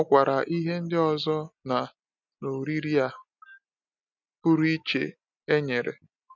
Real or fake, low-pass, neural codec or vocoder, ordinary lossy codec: real; 7.2 kHz; none; none